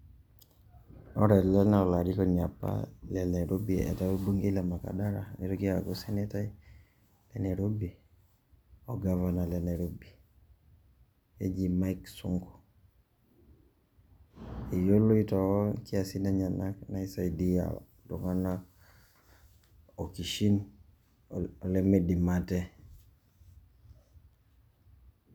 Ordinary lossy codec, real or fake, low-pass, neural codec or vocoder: none; real; none; none